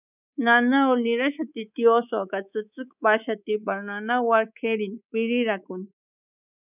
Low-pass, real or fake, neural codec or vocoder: 3.6 kHz; fake; codec, 24 kHz, 3.1 kbps, DualCodec